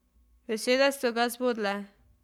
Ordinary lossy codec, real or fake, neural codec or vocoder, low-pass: none; fake; codec, 44.1 kHz, 7.8 kbps, Pupu-Codec; 19.8 kHz